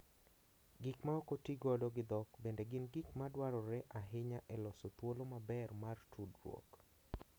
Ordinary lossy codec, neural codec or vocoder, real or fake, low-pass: none; none; real; none